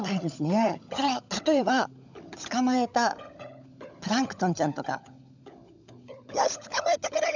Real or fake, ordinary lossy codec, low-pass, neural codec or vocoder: fake; none; 7.2 kHz; codec, 16 kHz, 16 kbps, FunCodec, trained on LibriTTS, 50 frames a second